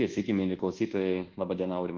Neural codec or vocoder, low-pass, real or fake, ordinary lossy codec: codec, 24 kHz, 1.2 kbps, DualCodec; 7.2 kHz; fake; Opus, 16 kbps